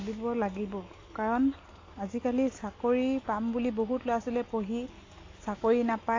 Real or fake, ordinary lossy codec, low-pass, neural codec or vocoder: real; AAC, 32 kbps; 7.2 kHz; none